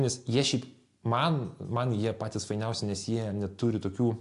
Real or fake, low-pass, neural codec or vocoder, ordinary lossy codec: real; 10.8 kHz; none; AAC, 64 kbps